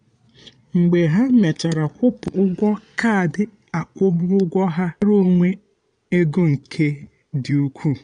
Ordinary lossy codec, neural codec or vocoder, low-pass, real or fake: none; vocoder, 22.05 kHz, 80 mel bands, Vocos; 9.9 kHz; fake